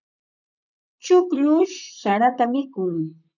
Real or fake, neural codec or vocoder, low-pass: fake; vocoder, 44.1 kHz, 128 mel bands, Pupu-Vocoder; 7.2 kHz